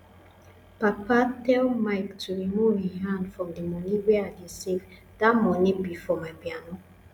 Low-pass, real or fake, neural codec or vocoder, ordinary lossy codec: 19.8 kHz; real; none; none